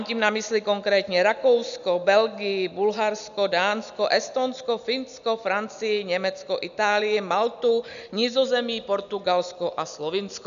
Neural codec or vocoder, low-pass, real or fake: none; 7.2 kHz; real